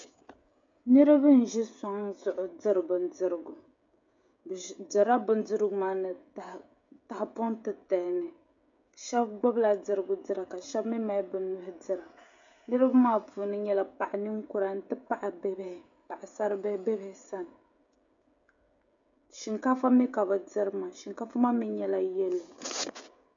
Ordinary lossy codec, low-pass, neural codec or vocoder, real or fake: MP3, 64 kbps; 7.2 kHz; codec, 16 kHz, 16 kbps, FreqCodec, smaller model; fake